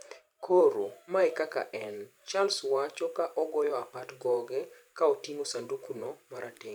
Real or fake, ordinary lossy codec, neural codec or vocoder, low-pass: fake; none; vocoder, 44.1 kHz, 128 mel bands every 512 samples, BigVGAN v2; none